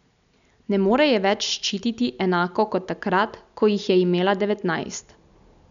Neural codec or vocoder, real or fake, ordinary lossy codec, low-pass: none; real; none; 7.2 kHz